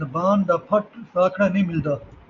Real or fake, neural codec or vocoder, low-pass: real; none; 7.2 kHz